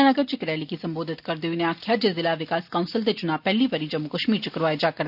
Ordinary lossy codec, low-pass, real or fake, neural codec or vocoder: none; 5.4 kHz; real; none